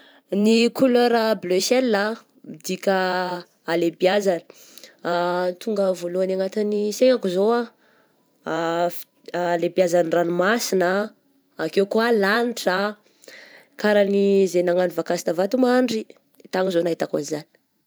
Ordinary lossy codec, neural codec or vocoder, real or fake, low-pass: none; vocoder, 44.1 kHz, 128 mel bands every 512 samples, BigVGAN v2; fake; none